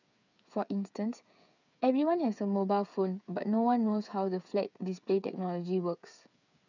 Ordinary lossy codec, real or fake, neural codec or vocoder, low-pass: none; fake; codec, 16 kHz, 8 kbps, FreqCodec, smaller model; 7.2 kHz